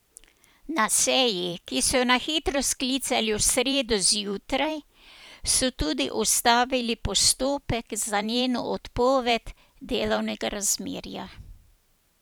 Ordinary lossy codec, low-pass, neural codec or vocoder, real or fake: none; none; vocoder, 44.1 kHz, 128 mel bands every 512 samples, BigVGAN v2; fake